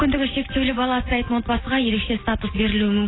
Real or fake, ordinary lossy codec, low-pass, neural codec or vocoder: real; AAC, 16 kbps; 7.2 kHz; none